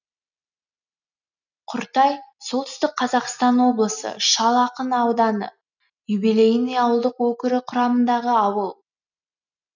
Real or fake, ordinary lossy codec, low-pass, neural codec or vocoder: real; none; 7.2 kHz; none